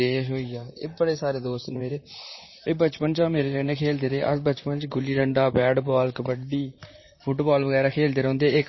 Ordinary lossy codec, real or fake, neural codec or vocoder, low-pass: MP3, 24 kbps; fake; vocoder, 44.1 kHz, 128 mel bands, Pupu-Vocoder; 7.2 kHz